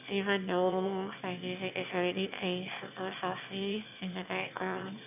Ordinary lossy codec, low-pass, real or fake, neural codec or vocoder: none; 3.6 kHz; fake; autoencoder, 22.05 kHz, a latent of 192 numbers a frame, VITS, trained on one speaker